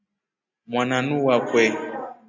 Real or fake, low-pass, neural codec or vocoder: real; 7.2 kHz; none